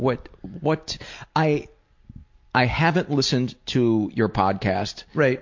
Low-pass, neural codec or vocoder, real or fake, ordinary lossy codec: 7.2 kHz; none; real; MP3, 48 kbps